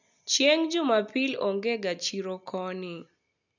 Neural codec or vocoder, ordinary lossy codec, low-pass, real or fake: none; none; 7.2 kHz; real